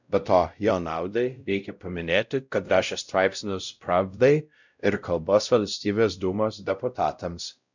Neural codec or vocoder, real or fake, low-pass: codec, 16 kHz, 0.5 kbps, X-Codec, WavLM features, trained on Multilingual LibriSpeech; fake; 7.2 kHz